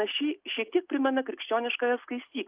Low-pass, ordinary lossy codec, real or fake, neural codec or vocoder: 3.6 kHz; Opus, 24 kbps; real; none